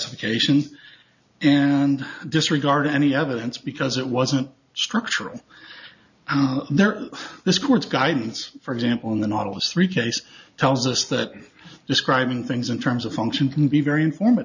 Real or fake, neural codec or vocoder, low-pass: real; none; 7.2 kHz